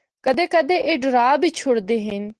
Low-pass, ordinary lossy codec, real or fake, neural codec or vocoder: 10.8 kHz; Opus, 16 kbps; real; none